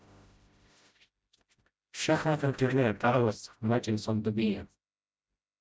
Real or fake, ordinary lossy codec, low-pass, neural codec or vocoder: fake; none; none; codec, 16 kHz, 0.5 kbps, FreqCodec, smaller model